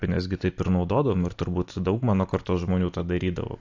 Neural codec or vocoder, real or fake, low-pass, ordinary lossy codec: none; real; 7.2 kHz; AAC, 48 kbps